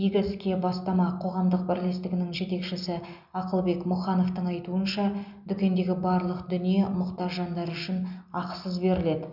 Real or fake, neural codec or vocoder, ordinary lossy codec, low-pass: real; none; none; 5.4 kHz